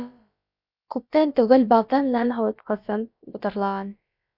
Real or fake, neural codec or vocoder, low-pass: fake; codec, 16 kHz, about 1 kbps, DyCAST, with the encoder's durations; 5.4 kHz